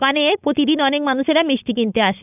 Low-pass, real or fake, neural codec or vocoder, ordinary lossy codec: 3.6 kHz; fake; codec, 16 kHz, 4.8 kbps, FACodec; none